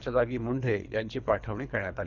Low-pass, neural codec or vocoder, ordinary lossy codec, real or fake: 7.2 kHz; codec, 24 kHz, 3 kbps, HILCodec; none; fake